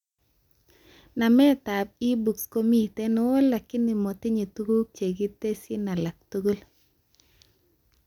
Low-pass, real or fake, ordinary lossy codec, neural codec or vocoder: 19.8 kHz; real; none; none